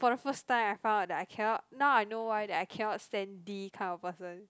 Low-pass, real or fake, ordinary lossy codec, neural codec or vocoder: none; real; none; none